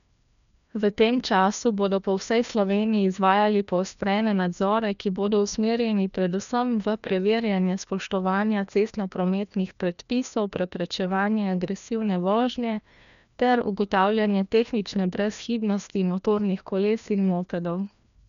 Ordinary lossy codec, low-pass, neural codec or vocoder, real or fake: none; 7.2 kHz; codec, 16 kHz, 1 kbps, FreqCodec, larger model; fake